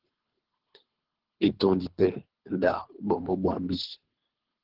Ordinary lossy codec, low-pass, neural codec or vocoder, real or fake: Opus, 16 kbps; 5.4 kHz; codec, 24 kHz, 3 kbps, HILCodec; fake